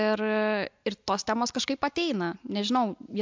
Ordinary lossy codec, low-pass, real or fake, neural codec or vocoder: MP3, 64 kbps; 7.2 kHz; real; none